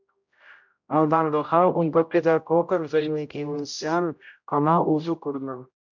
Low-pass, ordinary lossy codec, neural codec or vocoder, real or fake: 7.2 kHz; MP3, 64 kbps; codec, 16 kHz, 0.5 kbps, X-Codec, HuBERT features, trained on general audio; fake